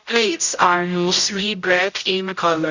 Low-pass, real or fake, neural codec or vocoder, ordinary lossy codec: 7.2 kHz; fake; codec, 16 kHz, 0.5 kbps, X-Codec, HuBERT features, trained on general audio; none